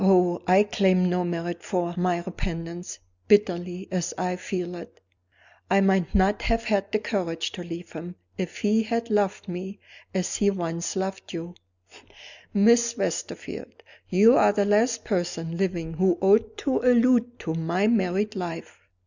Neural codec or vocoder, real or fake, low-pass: none; real; 7.2 kHz